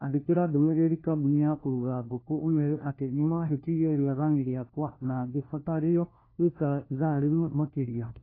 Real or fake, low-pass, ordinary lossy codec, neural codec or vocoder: fake; 5.4 kHz; AAC, 24 kbps; codec, 16 kHz, 1 kbps, FunCodec, trained on LibriTTS, 50 frames a second